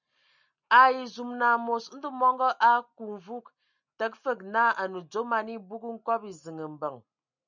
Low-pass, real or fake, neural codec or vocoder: 7.2 kHz; real; none